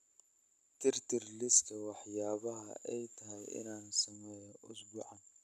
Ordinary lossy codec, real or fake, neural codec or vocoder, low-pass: none; real; none; 14.4 kHz